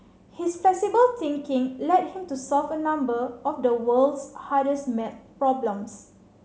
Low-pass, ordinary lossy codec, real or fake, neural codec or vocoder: none; none; real; none